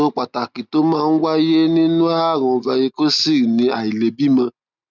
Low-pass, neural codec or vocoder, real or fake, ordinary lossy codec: 7.2 kHz; none; real; none